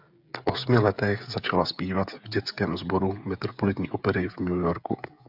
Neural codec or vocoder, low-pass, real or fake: codec, 16 kHz, 16 kbps, FreqCodec, smaller model; 5.4 kHz; fake